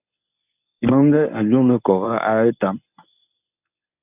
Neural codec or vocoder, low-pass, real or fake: codec, 24 kHz, 0.9 kbps, WavTokenizer, medium speech release version 1; 3.6 kHz; fake